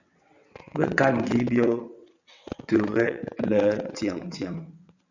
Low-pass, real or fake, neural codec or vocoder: 7.2 kHz; fake; vocoder, 22.05 kHz, 80 mel bands, WaveNeXt